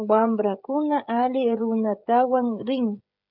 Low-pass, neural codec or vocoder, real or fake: 5.4 kHz; codec, 16 kHz, 16 kbps, FreqCodec, smaller model; fake